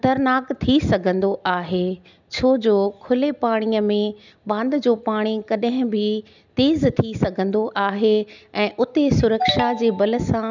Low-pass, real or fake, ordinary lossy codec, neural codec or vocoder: 7.2 kHz; real; none; none